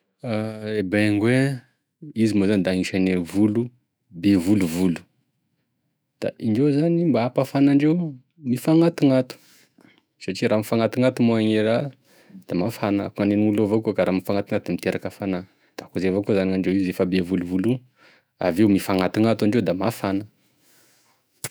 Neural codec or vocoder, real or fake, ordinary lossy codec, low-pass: autoencoder, 48 kHz, 128 numbers a frame, DAC-VAE, trained on Japanese speech; fake; none; none